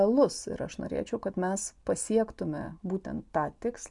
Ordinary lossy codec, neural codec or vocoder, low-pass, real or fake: MP3, 64 kbps; none; 10.8 kHz; real